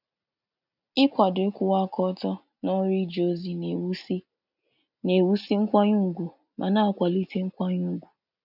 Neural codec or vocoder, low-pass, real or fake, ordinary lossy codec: none; 5.4 kHz; real; none